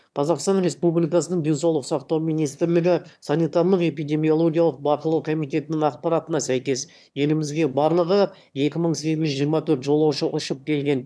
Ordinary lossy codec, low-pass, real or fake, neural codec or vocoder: none; none; fake; autoencoder, 22.05 kHz, a latent of 192 numbers a frame, VITS, trained on one speaker